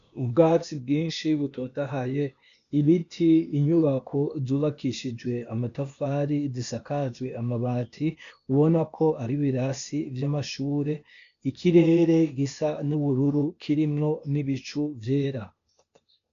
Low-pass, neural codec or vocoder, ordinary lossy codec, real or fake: 7.2 kHz; codec, 16 kHz, 0.8 kbps, ZipCodec; MP3, 96 kbps; fake